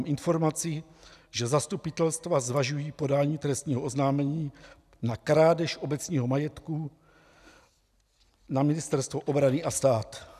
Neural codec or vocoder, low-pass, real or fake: none; 14.4 kHz; real